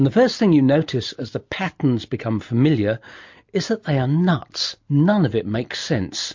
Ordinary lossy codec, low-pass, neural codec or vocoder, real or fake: MP3, 48 kbps; 7.2 kHz; none; real